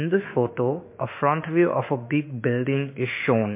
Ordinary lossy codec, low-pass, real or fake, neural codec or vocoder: MP3, 24 kbps; 3.6 kHz; fake; autoencoder, 48 kHz, 32 numbers a frame, DAC-VAE, trained on Japanese speech